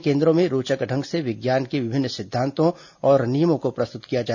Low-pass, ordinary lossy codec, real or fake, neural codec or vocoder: 7.2 kHz; none; real; none